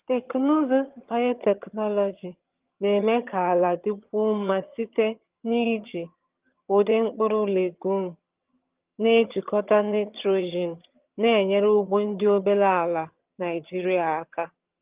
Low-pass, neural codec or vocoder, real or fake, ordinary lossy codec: 3.6 kHz; vocoder, 22.05 kHz, 80 mel bands, HiFi-GAN; fake; Opus, 32 kbps